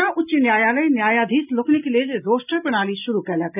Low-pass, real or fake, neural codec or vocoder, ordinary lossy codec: 3.6 kHz; real; none; none